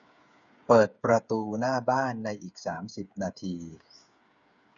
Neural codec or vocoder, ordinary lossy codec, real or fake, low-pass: codec, 16 kHz, 8 kbps, FreqCodec, smaller model; none; fake; 7.2 kHz